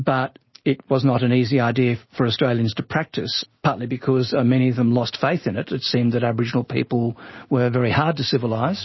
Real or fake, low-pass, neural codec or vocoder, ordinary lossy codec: real; 7.2 kHz; none; MP3, 24 kbps